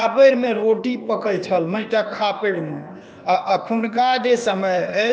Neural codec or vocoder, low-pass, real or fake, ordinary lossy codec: codec, 16 kHz, 0.8 kbps, ZipCodec; none; fake; none